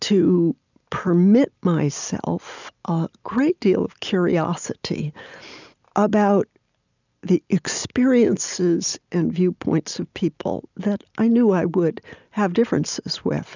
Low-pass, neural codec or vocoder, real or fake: 7.2 kHz; none; real